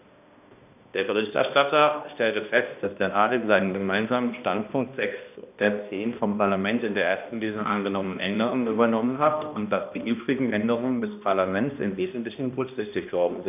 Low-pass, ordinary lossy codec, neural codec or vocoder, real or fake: 3.6 kHz; none; codec, 16 kHz, 1 kbps, X-Codec, HuBERT features, trained on balanced general audio; fake